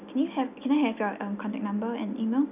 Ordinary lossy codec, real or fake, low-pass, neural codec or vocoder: none; real; 3.6 kHz; none